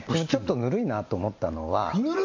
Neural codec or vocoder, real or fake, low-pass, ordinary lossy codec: none; real; 7.2 kHz; MP3, 64 kbps